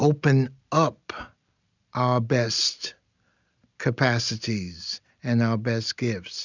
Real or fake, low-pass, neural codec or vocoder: real; 7.2 kHz; none